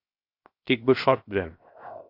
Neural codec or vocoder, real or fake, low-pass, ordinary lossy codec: codec, 16 kHz, 0.7 kbps, FocalCodec; fake; 5.4 kHz; AAC, 32 kbps